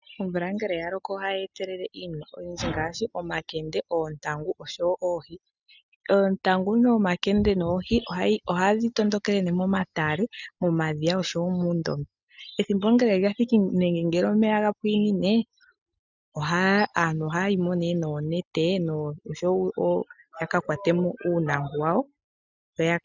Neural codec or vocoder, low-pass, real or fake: none; 7.2 kHz; real